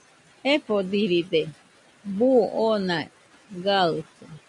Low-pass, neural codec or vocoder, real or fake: 10.8 kHz; none; real